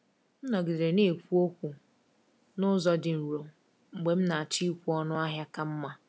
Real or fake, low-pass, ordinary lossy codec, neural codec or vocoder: real; none; none; none